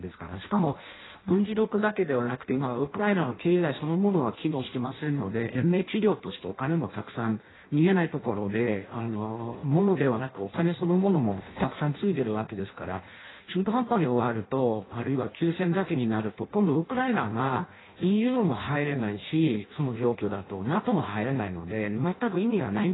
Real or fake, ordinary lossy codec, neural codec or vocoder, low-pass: fake; AAC, 16 kbps; codec, 16 kHz in and 24 kHz out, 0.6 kbps, FireRedTTS-2 codec; 7.2 kHz